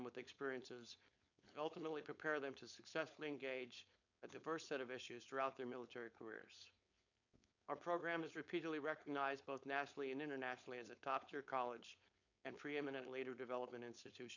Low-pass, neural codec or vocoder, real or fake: 7.2 kHz; codec, 16 kHz, 4.8 kbps, FACodec; fake